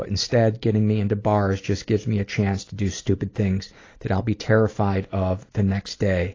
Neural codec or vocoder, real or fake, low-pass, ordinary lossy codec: none; real; 7.2 kHz; AAC, 32 kbps